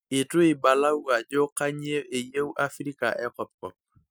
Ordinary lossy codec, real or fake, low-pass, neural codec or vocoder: none; real; none; none